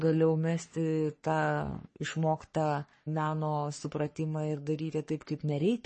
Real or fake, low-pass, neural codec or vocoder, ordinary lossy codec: fake; 10.8 kHz; codec, 44.1 kHz, 3.4 kbps, Pupu-Codec; MP3, 32 kbps